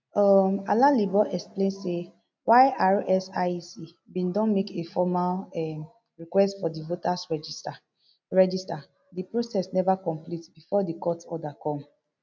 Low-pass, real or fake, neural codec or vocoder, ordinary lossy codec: none; real; none; none